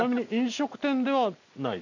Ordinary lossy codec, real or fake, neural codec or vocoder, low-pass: none; real; none; 7.2 kHz